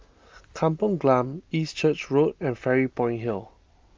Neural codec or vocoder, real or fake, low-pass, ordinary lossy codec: none; real; 7.2 kHz; Opus, 32 kbps